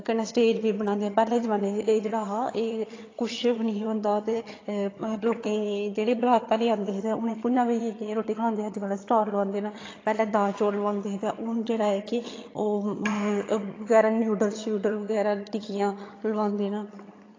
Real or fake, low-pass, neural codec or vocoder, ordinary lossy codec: fake; 7.2 kHz; vocoder, 22.05 kHz, 80 mel bands, HiFi-GAN; AAC, 32 kbps